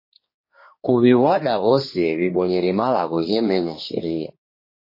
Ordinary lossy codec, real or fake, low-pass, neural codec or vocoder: MP3, 24 kbps; fake; 5.4 kHz; codec, 16 kHz, 2 kbps, X-Codec, HuBERT features, trained on general audio